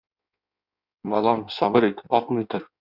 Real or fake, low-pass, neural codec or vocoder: fake; 5.4 kHz; codec, 16 kHz in and 24 kHz out, 1.1 kbps, FireRedTTS-2 codec